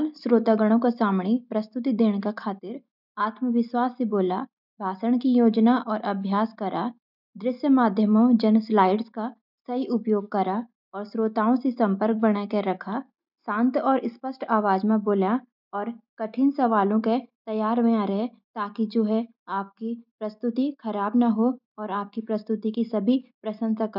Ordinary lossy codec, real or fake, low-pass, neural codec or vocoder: none; real; 5.4 kHz; none